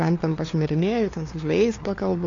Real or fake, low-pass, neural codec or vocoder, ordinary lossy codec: fake; 7.2 kHz; codec, 16 kHz, 2 kbps, FunCodec, trained on LibriTTS, 25 frames a second; AAC, 32 kbps